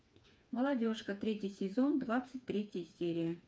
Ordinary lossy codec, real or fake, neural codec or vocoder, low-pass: none; fake; codec, 16 kHz, 4 kbps, FreqCodec, smaller model; none